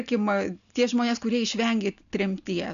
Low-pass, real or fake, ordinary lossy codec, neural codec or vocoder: 7.2 kHz; real; MP3, 96 kbps; none